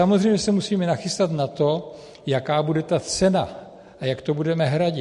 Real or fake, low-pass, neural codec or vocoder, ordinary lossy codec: real; 14.4 kHz; none; MP3, 48 kbps